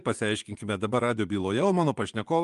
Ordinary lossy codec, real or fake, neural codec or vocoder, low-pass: Opus, 32 kbps; fake; vocoder, 24 kHz, 100 mel bands, Vocos; 10.8 kHz